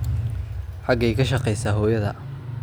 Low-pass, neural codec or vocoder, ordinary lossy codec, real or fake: none; none; none; real